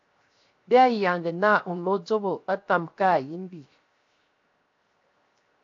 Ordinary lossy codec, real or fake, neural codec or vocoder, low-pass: AAC, 48 kbps; fake; codec, 16 kHz, 0.7 kbps, FocalCodec; 7.2 kHz